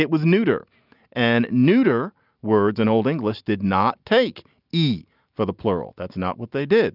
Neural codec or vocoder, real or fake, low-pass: none; real; 5.4 kHz